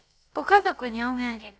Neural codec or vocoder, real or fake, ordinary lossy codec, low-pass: codec, 16 kHz, about 1 kbps, DyCAST, with the encoder's durations; fake; none; none